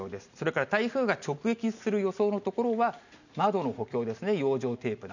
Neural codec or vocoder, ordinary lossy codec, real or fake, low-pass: none; none; real; 7.2 kHz